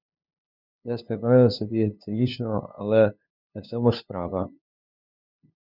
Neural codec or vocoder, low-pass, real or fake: codec, 16 kHz, 2 kbps, FunCodec, trained on LibriTTS, 25 frames a second; 5.4 kHz; fake